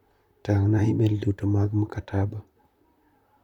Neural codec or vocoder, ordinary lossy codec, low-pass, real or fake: vocoder, 44.1 kHz, 128 mel bands, Pupu-Vocoder; none; 19.8 kHz; fake